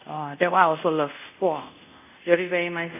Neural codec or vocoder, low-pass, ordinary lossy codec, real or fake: codec, 24 kHz, 0.5 kbps, DualCodec; 3.6 kHz; none; fake